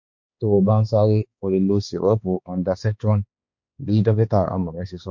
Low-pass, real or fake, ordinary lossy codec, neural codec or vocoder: 7.2 kHz; fake; MP3, 48 kbps; codec, 16 kHz, 2 kbps, X-Codec, HuBERT features, trained on balanced general audio